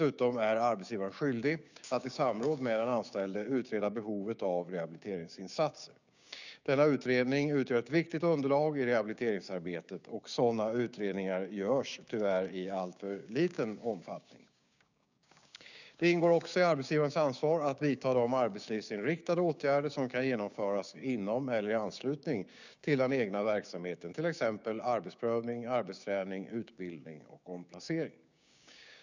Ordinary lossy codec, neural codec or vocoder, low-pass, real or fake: none; codec, 16 kHz, 6 kbps, DAC; 7.2 kHz; fake